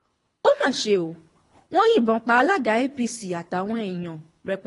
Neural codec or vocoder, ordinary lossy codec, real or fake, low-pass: codec, 24 kHz, 3 kbps, HILCodec; AAC, 32 kbps; fake; 10.8 kHz